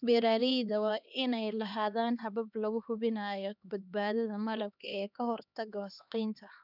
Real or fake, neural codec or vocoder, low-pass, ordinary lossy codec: fake; codec, 16 kHz, 2 kbps, X-Codec, HuBERT features, trained on LibriSpeech; 5.4 kHz; none